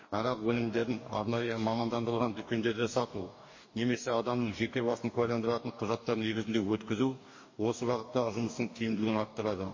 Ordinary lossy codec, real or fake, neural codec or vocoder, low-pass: MP3, 32 kbps; fake; codec, 44.1 kHz, 2.6 kbps, DAC; 7.2 kHz